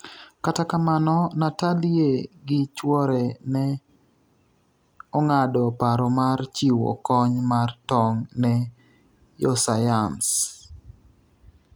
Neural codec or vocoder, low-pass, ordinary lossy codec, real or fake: none; none; none; real